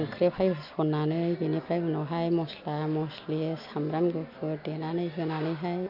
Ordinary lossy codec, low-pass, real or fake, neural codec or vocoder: none; 5.4 kHz; real; none